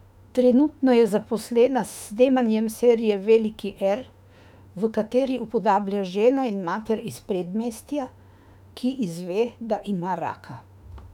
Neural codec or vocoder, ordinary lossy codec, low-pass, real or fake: autoencoder, 48 kHz, 32 numbers a frame, DAC-VAE, trained on Japanese speech; none; 19.8 kHz; fake